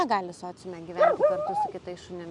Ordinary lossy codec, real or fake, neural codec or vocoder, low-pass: MP3, 96 kbps; real; none; 10.8 kHz